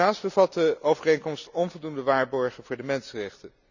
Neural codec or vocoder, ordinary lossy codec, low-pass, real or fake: none; none; 7.2 kHz; real